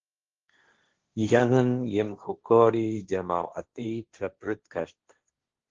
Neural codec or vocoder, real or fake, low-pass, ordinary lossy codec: codec, 16 kHz, 1.1 kbps, Voila-Tokenizer; fake; 7.2 kHz; Opus, 16 kbps